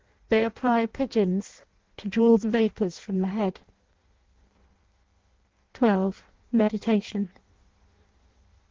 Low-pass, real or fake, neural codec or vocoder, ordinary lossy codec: 7.2 kHz; fake; codec, 16 kHz in and 24 kHz out, 0.6 kbps, FireRedTTS-2 codec; Opus, 16 kbps